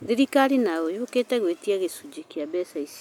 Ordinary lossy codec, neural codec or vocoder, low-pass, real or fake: none; none; 19.8 kHz; real